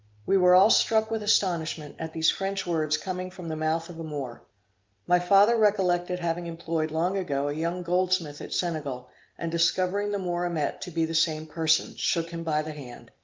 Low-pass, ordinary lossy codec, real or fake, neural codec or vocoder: 7.2 kHz; Opus, 24 kbps; real; none